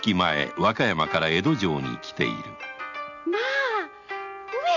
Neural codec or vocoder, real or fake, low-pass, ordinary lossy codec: none; real; 7.2 kHz; none